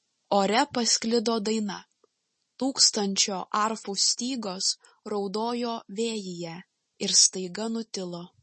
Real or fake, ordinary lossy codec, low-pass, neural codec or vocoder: real; MP3, 32 kbps; 10.8 kHz; none